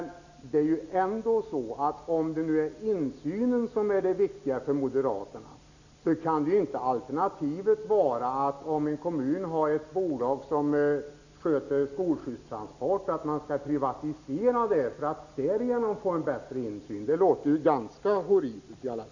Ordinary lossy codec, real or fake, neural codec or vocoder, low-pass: none; real; none; 7.2 kHz